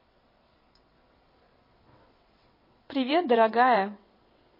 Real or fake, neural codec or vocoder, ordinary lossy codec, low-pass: fake; vocoder, 22.05 kHz, 80 mel bands, WaveNeXt; MP3, 24 kbps; 5.4 kHz